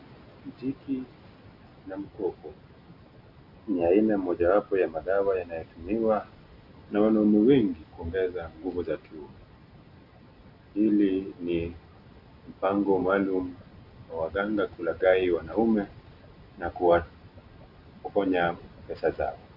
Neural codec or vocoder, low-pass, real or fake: none; 5.4 kHz; real